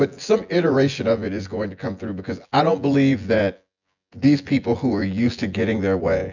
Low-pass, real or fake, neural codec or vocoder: 7.2 kHz; fake; vocoder, 24 kHz, 100 mel bands, Vocos